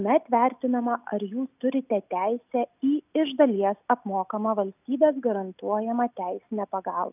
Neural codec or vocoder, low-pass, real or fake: none; 3.6 kHz; real